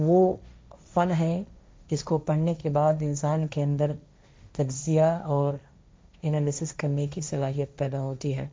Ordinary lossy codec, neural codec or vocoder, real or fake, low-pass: none; codec, 16 kHz, 1.1 kbps, Voila-Tokenizer; fake; none